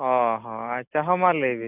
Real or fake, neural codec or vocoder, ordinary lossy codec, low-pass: real; none; AAC, 32 kbps; 3.6 kHz